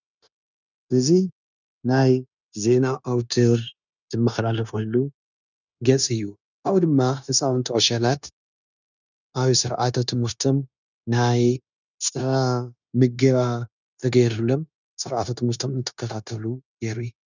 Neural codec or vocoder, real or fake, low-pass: codec, 16 kHz, 0.9 kbps, LongCat-Audio-Codec; fake; 7.2 kHz